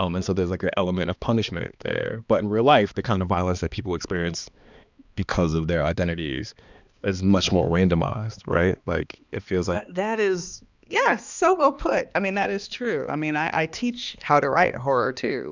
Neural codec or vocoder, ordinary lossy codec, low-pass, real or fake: codec, 16 kHz, 2 kbps, X-Codec, HuBERT features, trained on balanced general audio; Opus, 64 kbps; 7.2 kHz; fake